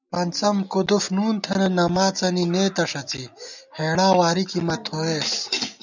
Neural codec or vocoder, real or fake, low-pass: none; real; 7.2 kHz